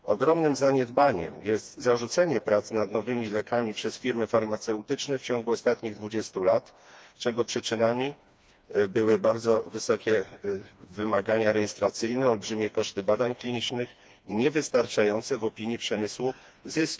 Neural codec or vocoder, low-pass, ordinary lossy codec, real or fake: codec, 16 kHz, 2 kbps, FreqCodec, smaller model; none; none; fake